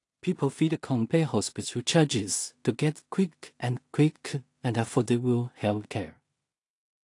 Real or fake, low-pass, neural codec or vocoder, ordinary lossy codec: fake; 10.8 kHz; codec, 16 kHz in and 24 kHz out, 0.4 kbps, LongCat-Audio-Codec, two codebook decoder; AAC, 48 kbps